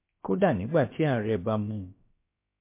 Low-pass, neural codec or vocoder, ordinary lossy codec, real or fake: 3.6 kHz; codec, 16 kHz, about 1 kbps, DyCAST, with the encoder's durations; MP3, 24 kbps; fake